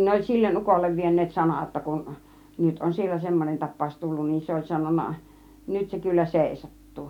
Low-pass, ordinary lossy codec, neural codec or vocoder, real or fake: 19.8 kHz; none; none; real